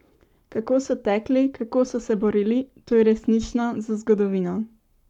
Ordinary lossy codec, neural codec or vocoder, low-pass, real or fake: none; codec, 44.1 kHz, 7.8 kbps, Pupu-Codec; 19.8 kHz; fake